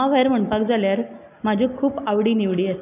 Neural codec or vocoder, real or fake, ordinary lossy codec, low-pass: none; real; none; 3.6 kHz